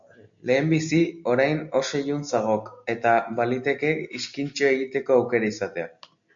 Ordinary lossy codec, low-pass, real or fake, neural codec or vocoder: MP3, 64 kbps; 7.2 kHz; real; none